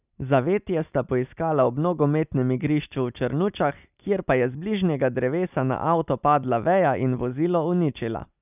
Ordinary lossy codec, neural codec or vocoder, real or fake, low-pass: none; none; real; 3.6 kHz